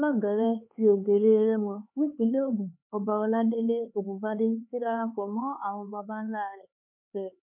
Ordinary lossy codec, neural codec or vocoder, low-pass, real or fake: MP3, 32 kbps; codec, 16 kHz, 4 kbps, X-Codec, HuBERT features, trained on LibriSpeech; 3.6 kHz; fake